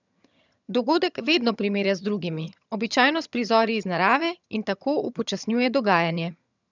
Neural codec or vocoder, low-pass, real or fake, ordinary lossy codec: vocoder, 22.05 kHz, 80 mel bands, HiFi-GAN; 7.2 kHz; fake; none